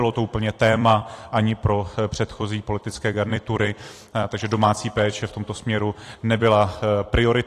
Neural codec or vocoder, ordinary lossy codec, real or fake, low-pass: vocoder, 44.1 kHz, 128 mel bands every 256 samples, BigVGAN v2; AAC, 48 kbps; fake; 14.4 kHz